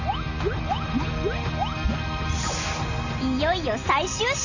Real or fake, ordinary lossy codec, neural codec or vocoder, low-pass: real; none; none; 7.2 kHz